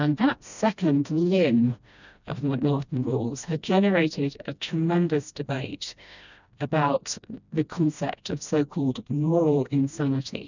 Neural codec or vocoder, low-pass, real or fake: codec, 16 kHz, 1 kbps, FreqCodec, smaller model; 7.2 kHz; fake